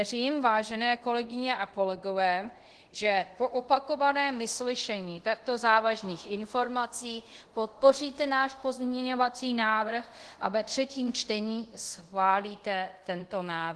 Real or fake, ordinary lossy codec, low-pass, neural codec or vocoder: fake; Opus, 16 kbps; 10.8 kHz; codec, 24 kHz, 0.5 kbps, DualCodec